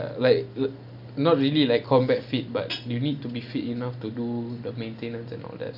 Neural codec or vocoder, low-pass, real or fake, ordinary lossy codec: none; 5.4 kHz; real; none